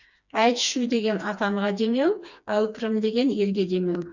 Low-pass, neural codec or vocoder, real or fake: 7.2 kHz; codec, 16 kHz, 2 kbps, FreqCodec, smaller model; fake